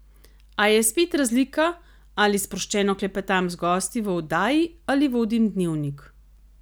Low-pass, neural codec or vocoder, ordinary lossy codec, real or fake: none; none; none; real